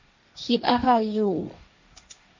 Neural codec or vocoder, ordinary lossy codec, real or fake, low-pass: codec, 16 kHz, 1.1 kbps, Voila-Tokenizer; MP3, 48 kbps; fake; 7.2 kHz